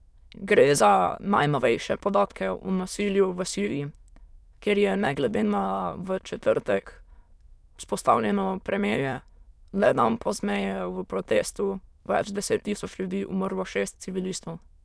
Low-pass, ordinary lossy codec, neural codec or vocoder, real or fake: none; none; autoencoder, 22.05 kHz, a latent of 192 numbers a frame, VITS, trained on many speakers; fake